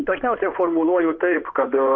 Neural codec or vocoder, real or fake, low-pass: codec, 16 kHz in and 24 kHz out, 2.2 kbps, FireRedTTS-2 codec; fake; 7.2 kHz